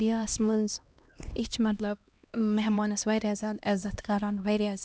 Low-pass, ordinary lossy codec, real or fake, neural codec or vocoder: none; none; fake; codec, 16 kHz, 1 kbps, X-Codec, HuBERT features, trained on LibriSpeech